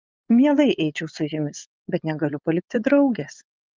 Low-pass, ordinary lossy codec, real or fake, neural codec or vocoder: 7.2 kHz; Opus, 24 kbps; fake; vocoder, 24 kHz, 100 mel bands, Vocos